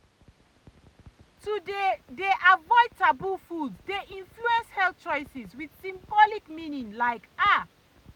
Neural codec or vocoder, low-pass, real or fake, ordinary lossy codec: none; 19.8 kHz; real; none